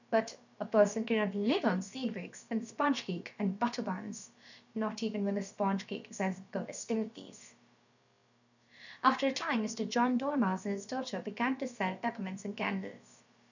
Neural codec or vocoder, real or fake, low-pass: codec, 16 kHz, about 1 kbps, DyCAST, with the encoder's durations; fake; 7.2 kHz